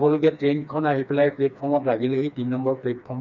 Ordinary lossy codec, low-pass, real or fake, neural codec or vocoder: none; 7.2 kHz; fake; codec, 16 kHz, 2 kbps, FreqCodec, smaller model